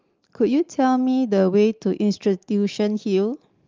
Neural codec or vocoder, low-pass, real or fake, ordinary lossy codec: none; 7.2 kHz; real; Opus, 32 kbps